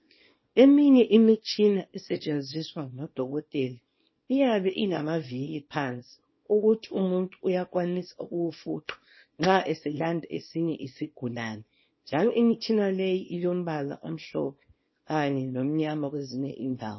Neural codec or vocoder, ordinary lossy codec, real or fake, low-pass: codec, 24 kHz, 0.9 kbps, WavTokenizer, small release; MP3, 24 kbps; fake; 7.2 kHz